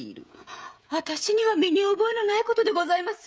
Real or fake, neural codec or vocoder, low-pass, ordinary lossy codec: fake; codec, 16 kHz, 16 kbps, FreqCodec, smaller model; none; none